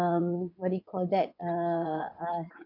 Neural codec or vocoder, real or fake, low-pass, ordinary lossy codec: vocoder, 22.05 kHz, 80 mel bands, WaveNeXt; fake; 5.4 kHz; none